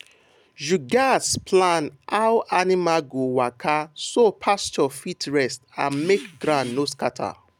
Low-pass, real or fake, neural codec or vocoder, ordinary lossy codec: 19.8 kHz; real; none; none